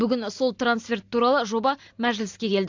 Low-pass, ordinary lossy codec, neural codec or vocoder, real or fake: 7.2 kHz; AAC, 48 kbps; none; real